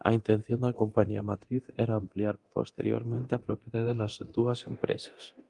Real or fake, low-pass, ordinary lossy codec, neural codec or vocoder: fake; 10.8 kHz; Opus, 32 kbps; codec, 24 kHz, 0.9 kbps, DualCodec